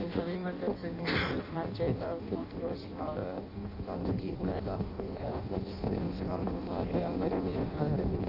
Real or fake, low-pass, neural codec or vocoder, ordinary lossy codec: fake; 5.4 kHz; codec, 16 kHz in and 24 kHz out, 0.6 kbps, FireRedTTS-2 codec; none